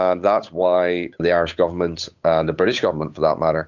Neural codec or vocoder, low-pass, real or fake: codec, 16 kHz, 8 kbps, FunCodec, trained on Chinese and English, 25 frames a second; 7.2 kHz; fake